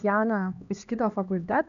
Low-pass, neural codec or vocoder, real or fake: 7.2 kHz; codec, 16 kHz, 2 kbps, X-Codec, HuBERT features, trained on LibriSpeech; fake